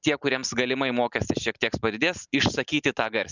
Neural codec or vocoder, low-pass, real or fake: none; 7.2 kHz; real